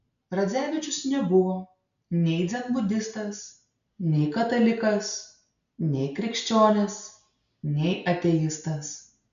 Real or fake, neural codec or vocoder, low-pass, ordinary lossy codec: real; none; 7.2 kHz; MP3, 96 kbps